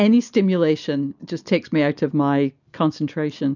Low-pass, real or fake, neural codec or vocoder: 7.2 kHz; real; none